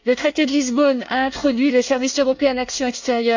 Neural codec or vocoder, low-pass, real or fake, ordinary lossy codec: codec, 24 kHz, 1 kbps, SNAC; 7.2 kHz; fake; none